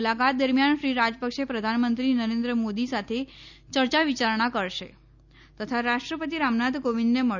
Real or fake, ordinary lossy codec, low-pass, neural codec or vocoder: real; none; 7.2 kHz; none